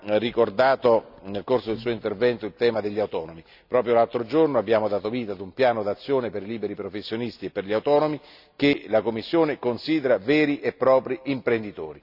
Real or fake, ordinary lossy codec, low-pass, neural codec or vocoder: real; none; 5.4 kHz; none